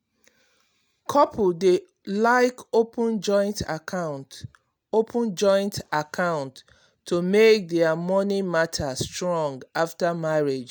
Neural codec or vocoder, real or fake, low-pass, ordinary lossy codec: none; real; none; none